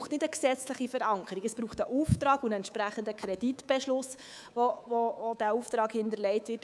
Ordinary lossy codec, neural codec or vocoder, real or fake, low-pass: none; codec, 24 kHz, 3.1 kbps, DualCodec; fake; none